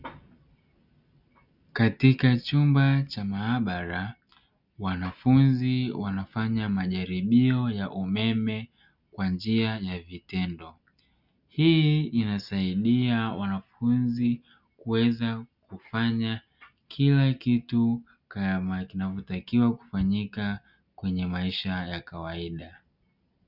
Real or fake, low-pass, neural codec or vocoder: real; 5.4 kHz; none